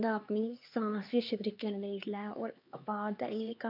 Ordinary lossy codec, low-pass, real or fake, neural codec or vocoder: MP3, 48 kbps; 5.4 kHz; fake; codec, 16 kHz, 2 kbps, X-Codec, HuBERT features, trained on LibriSpeech